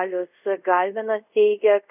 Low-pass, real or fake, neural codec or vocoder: 3.6 kHz; fake; codec, 24 kHz, 0.5 kbps, DualCodec